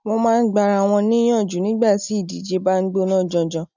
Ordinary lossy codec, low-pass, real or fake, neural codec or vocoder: none; 7.2 kHz; real; none